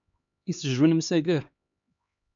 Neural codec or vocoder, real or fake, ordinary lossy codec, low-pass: codec, 16 kHz, 2 kbps, X-Codec, HuBERT features, trained on LibriSpeech; fake; MP3, 64 kbps; 7.2 kHz